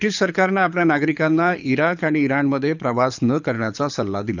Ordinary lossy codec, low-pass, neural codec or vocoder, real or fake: none; 7.2 kHz; codec, 24 kHz, 6 kbps, HILCodec; fake